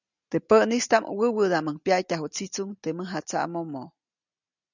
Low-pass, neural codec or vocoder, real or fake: 7.2 kHz; none; real